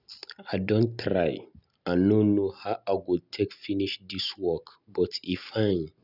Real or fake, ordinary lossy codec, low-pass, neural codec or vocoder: real; none; 5.4 kHz; none